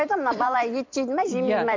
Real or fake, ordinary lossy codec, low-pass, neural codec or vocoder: fake; MP3, 64 kbps; 7.2 kHz; vocoder, 44.1 kHz, 128 mel bands every 256 samples, BigVGAN v2